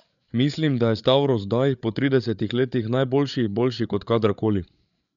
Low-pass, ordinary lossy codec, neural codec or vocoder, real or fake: 7.2 kHz; none; codec, 16 kHz, 8 kbps, FreqCodec, larger model; fake